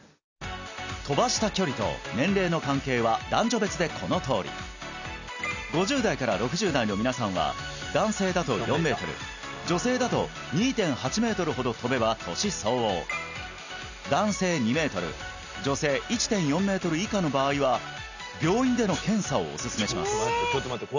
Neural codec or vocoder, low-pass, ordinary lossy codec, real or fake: none; 7.2 kHz; none; real